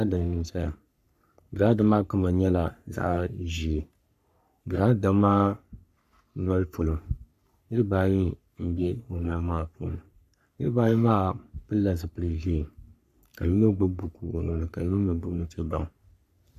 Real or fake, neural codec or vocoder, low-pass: fake; codec, 44.1 kHz, 3.4 kbps, Pupu-Codec; 14.4 kHz